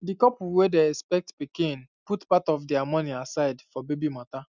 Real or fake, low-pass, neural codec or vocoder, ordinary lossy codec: real; 7.2 kHz; none; none